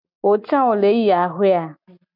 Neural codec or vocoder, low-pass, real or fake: none; 5.4 kHz; real